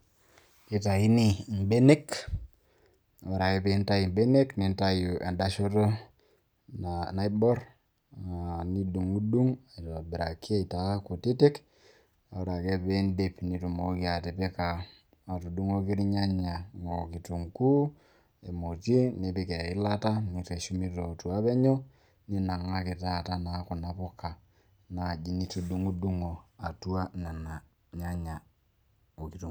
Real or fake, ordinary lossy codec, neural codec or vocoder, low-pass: real; none; none; none